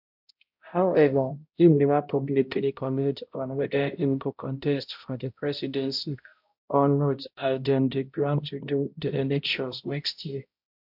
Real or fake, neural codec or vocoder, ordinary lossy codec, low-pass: fake; codec, 16 kHz, 0.5 kbps, X-Codec, HuBERT features, trained on balanced general audio; MP3, 48 kbps; 5.4 kHz